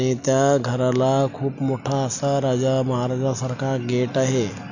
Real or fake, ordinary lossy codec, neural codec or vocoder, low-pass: real; none; none; 7.2 kHz